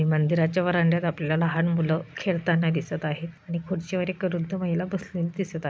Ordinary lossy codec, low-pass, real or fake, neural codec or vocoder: none; none; real; none